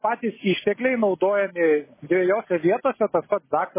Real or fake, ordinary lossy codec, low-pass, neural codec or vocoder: real; MP3, 16 kbps; 3.6 kHz; none